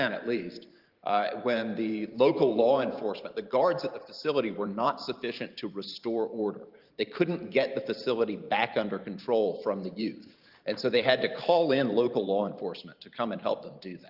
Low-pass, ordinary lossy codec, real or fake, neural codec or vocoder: 5.4 kHz; Opus, 32 kbps; fake; vocoder, 44.1 kHz, 128 mel bands every 512 samples, BigVGAN v2